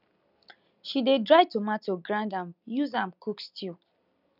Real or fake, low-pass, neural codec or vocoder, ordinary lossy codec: real; 5.4 kHz; none; none